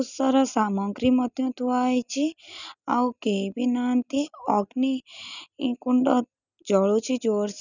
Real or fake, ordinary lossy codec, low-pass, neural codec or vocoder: real; none; 7.2 kHz; none